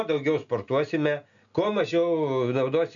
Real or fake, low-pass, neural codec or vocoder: real; 7.2 kHz; none